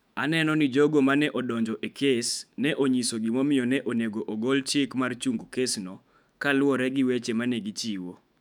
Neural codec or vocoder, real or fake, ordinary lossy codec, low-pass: autoencoder, 48 kHz, 128 numbers a frame, DAC-VAE, trained on Japanese speech; fake; none; 19.8 kHz